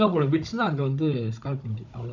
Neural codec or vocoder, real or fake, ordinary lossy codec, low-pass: codec, 16 kHz, 16 kbps, FunCodec, trained on Chinese and English, 50 frames a second; fake; none; 7.2 kHz